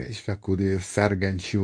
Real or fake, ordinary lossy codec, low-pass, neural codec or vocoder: fake; MP3, 48 kbps; 9.9 kHz; codec, 24 kHz, 0.9 kbps, WavTokenizer, medium speech release version 2